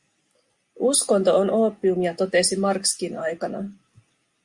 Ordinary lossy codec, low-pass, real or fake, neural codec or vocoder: Opus, 64 kbps; 10.8 kHz; real; none